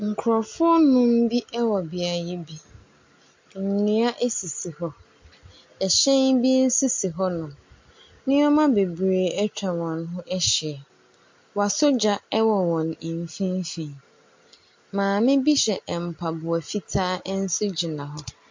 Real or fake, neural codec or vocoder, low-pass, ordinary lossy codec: real; none; 7.2 kHz; MP3, 48 kbps